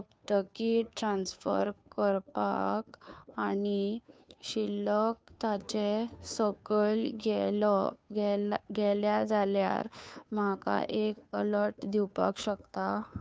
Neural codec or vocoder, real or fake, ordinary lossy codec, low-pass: codec, 16 kHz, 2 kbps, FunCodec, trained on Chinese and English, 25 frames a second; fake; none; none